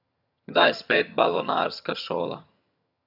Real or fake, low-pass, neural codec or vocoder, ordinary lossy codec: fake; 5.4 kHz; vocoder, 22.05 kHz, 80 mel bands, HiFi-GAN; none